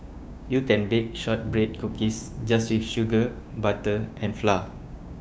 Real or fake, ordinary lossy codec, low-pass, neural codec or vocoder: fake; none; none; codec, 16 kHz, 6 kbps, DAC